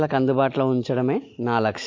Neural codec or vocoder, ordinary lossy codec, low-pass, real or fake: none; MP3, 48 kbps; 7.2 kHz; real